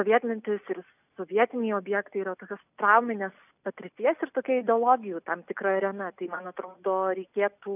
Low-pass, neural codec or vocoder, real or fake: 3.6 kHz; none; real